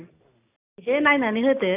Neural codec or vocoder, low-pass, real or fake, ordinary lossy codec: none; 3.6 kHz; real; none